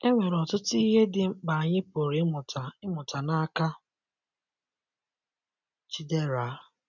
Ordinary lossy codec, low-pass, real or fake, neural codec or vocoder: none; 7.2 kHz; real; none